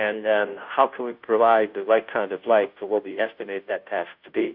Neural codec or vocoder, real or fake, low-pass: codec, 16 kHz, 0.5 kbps, FunCodec, trained on Chinese and English, 25 frames a second; fake; 5.4 kHz